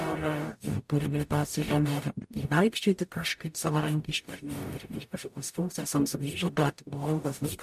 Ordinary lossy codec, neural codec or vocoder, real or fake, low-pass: MP3, 64 kbps; codec, 44.1 kHz, 0.9 kbps, DAC; fake; 14.4 kHz